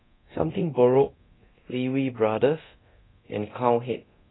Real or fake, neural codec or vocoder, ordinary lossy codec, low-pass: fake; codec, 24 kHz, 0.9 kbps, DualCodec; AAC, 16 kbps; 7.2 kHz